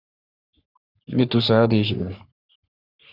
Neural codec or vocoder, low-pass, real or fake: codec, 44.1 kHz, 3.4 kbps, Pupu-Codec; 5.4 kHz; fake